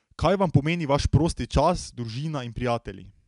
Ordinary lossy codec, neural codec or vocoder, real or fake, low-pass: none; none; real; 10.8 kHz